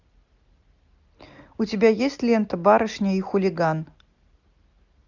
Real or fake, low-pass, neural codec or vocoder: real; 7.2 kHz; none